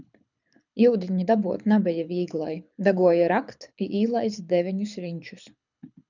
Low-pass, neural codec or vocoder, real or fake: 7.2 kHz; codec, 24 kHz, 6 kbps, HILCodec; fake